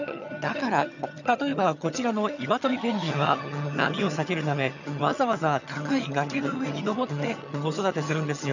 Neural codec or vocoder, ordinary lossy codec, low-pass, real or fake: vocoder, 22.05 kHz, 80 mel bands, HiFi-GAN; none; 7.2 kHz; fake